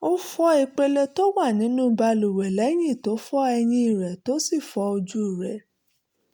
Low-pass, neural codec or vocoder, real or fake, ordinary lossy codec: none; none; real; none